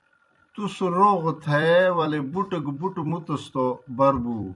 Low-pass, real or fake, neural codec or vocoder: 10.8 kHz; fake; vocoder, 44.1 kHz, 128 mel bands every 256 samples, BigVGAN v2